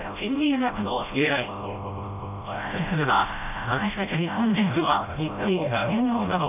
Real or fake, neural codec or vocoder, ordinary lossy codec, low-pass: fake; codec, 16 kHz, 0.5 kbps, FreqCodec, smaller model; none; 3.6 kHz